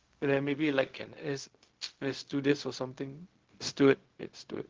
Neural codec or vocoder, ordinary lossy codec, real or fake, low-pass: codec, 16 kHz, 0.4 kbps, LongCat-Audio-Codec; Opus, 16 kbps; fake; 7.2 kHz